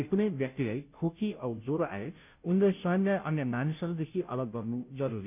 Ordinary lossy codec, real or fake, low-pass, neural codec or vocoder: none; fake; 3.6 kHz; codec, 16 kHz, 0.5 kbps, FunCodec, trained on Chinese and English, 25 frames a second